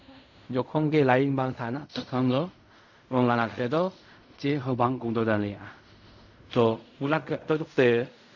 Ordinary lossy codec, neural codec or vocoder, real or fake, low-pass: none; codec, 16 kHz in and 24 kHz out, 0.4 kbps, LongCat-Audio-Codec, fine tuned four codebook decoder; fake; 7.2 kHz